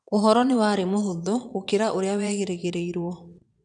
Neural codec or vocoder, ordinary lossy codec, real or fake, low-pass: vocoder, 22.05 kHz, 80 mel bands, Vocos; none; fake; 9.9 kHz